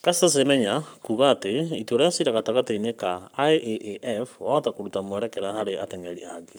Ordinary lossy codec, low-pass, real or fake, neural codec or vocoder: none; none; fake; codec, 44.1 kHz, 7.8 kbps, Pupu-Codec